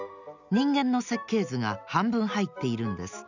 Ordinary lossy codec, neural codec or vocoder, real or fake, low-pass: none; none; real; 7.2 kHz